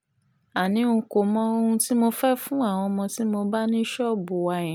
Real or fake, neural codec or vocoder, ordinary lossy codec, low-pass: real; none; none; none